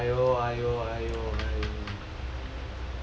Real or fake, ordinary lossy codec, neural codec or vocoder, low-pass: real; none; none; none